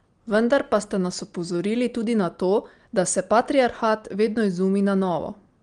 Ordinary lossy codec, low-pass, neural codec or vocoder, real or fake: Opus, 24 kbps; 9.9 kHz; none; real